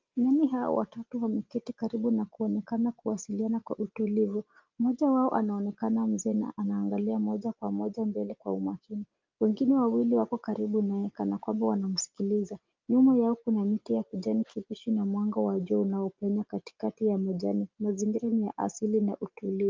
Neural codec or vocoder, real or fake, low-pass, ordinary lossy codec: none; real; 7.2 kHz; Opus, 32 kbps